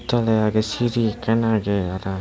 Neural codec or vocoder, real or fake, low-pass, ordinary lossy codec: none; real; none; none